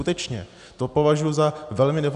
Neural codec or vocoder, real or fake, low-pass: none; real; 10.8 kHz